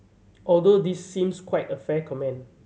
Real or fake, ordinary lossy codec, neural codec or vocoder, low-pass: real; none; none; none